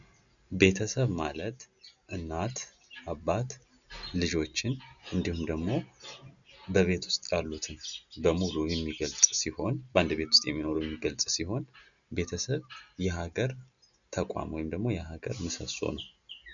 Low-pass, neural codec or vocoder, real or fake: 7.2 kHz; none; real